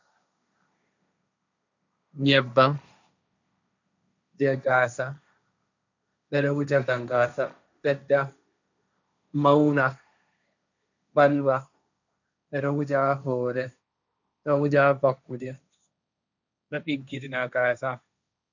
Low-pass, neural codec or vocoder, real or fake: 7.2 kHz; codec, 16 kHz, 1.1 kbps, Voila-Tokenizer; fake